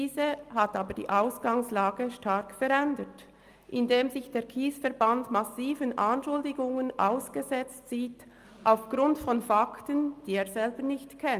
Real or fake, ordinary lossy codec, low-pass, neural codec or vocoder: real; Opus, 32 kbps; 14.4 kHz; none